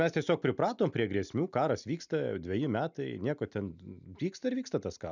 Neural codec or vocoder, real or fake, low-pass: none; real; 7.2 kHz